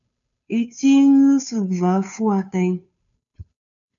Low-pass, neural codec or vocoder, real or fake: 7.2 kHz; codec, 16 kHz, 2 kbps, FunCodec, trained on Chinese and English, 25 frames a second; fake